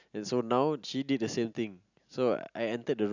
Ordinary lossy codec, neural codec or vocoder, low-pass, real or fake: none; none; 7.2 kHz; real